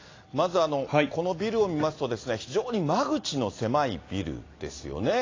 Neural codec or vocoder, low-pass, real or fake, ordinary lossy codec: none; 7.2 kHz; real; AAC, 32 kbps